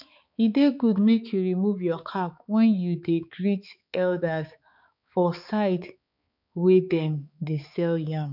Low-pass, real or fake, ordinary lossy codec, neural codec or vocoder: 5.4 kHz; fake; none; codec, 16 kHz, 4 kbps, X-Codec, HuBERT features, trained on balanced general audio